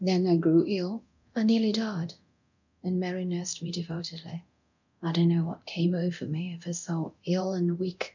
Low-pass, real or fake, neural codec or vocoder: 7.2 kHz; fake; codec, 24 kHz, 0.9 kbps, DualCodec